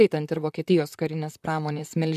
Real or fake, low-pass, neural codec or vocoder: fake; 14.4 kHz; vocoder, 44.1 kHz, 128 mel bands, Pupu-Vocoder